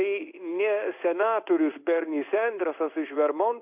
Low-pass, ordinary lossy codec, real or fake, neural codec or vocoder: 3.6 kHz; AAC, 32 kbps; fake; codec, 16 kHz in and 24 kHz out, 1 kbps, XY-Tokenizer